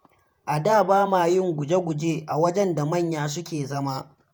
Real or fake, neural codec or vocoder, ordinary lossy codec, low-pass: fake; vocoder, 48 kHz, 128 mel bands, Vocos; none; none